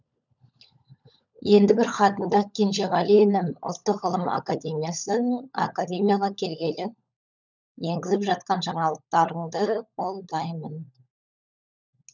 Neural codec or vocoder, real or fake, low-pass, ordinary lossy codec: codec, 16 kHz, 16 kbps, FunCodec, trained on LibriTTS, 50 frames a second; fake; 7.2 kHz; none